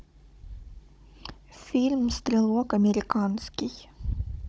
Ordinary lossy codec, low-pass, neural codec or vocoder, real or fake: none; none; codec, 16 kHz, 16 kbps, FunCodec, trained on Chinese and English, 50 frames a second; fake